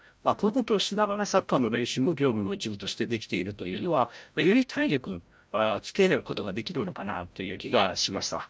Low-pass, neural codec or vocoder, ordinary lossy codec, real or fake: none; codec, 16 kHz, 0.5 kbps, FreqCodec, larger model; none; fake